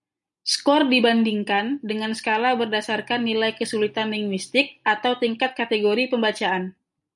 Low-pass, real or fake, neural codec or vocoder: 10.8 kHz; real; none